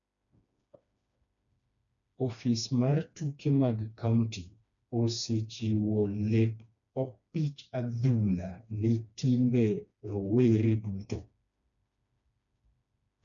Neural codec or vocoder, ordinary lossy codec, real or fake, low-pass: codec, 16 kHz, 2 kbps, FreqCodec, smaller model; none; fake; 7.2 kHz